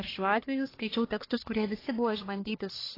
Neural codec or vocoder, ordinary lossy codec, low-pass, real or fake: codec, 44.1 kHz, 1.7 kbps, Pupu-Codec; AAC, 24 kbps; 5.4 kHz; fake